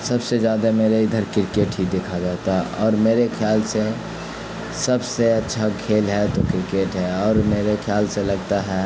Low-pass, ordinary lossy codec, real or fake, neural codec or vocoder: none; none; real; none